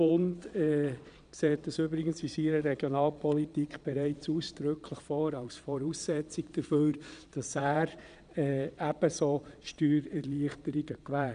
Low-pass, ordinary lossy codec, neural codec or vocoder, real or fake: 9.9 kHz; none; vocoder, 22.05 kHz, 80 mel bands, Vocos; fake